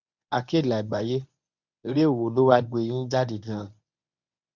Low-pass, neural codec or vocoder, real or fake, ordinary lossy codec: 7.2 kHz; codec, 24 kHz, 0.9 kbps, WavTokenizer, medium speech release version 1; fake; none